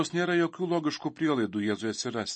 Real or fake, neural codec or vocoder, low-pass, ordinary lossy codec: real; none; 9.9 kHz; MP3, 32 kbps